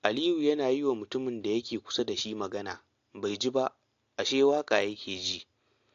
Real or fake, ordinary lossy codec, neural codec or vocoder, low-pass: real; AAC, 48 kbps; none; 7.2 kHz